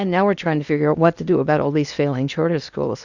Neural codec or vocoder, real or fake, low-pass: codec, 16 kHz in and 24 kHz out, 0.8 kbps, FocalCodec, streaming, 65536 codes; fake; 7.2 kHz